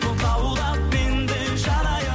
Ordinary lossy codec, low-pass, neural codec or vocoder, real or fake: none; none; none; real